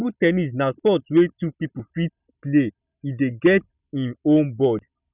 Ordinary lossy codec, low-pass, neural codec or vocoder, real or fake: none; 3.6 kHz; none; real